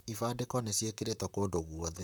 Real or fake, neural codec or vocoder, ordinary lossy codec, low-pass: fake; vocoder, 44.1 kHz, 128 mel bands, Pupu-Vocoder; none; none